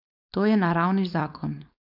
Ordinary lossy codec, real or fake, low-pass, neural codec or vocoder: none; fake; 5.4 kHz; codec, 16 kHz, 4.8 kbps, FACodec